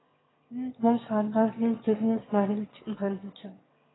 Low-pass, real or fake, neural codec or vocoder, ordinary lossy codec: 7.2 kHz; fake; autoencoder, 22.05 kHz, a latent of 192 numbers a frame, VITS, trained on one speaker; AAC, 16 kbps